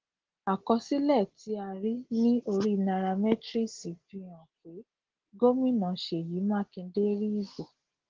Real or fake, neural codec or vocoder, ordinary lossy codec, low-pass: real; none; Opus, 16 kbps; 7.2 kHz